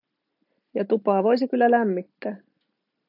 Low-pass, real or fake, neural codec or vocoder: 5.4 kHz; real; none